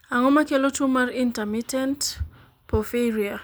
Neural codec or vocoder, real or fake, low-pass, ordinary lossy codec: none; real; none; none